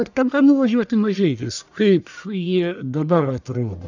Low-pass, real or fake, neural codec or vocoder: 7.2 kHz; fake; codec, 44.1 kHz, 1.7 kbps, Pupu-Codec